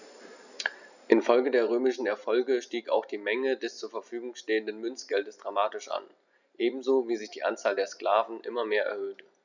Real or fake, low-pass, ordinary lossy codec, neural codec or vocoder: real; 7.2 kHz; none; none